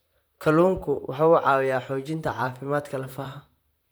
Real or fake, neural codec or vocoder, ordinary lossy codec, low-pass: fake; vocoder, 44.1 kHz, 128 mel bands, Pupu-Vocoder; none; none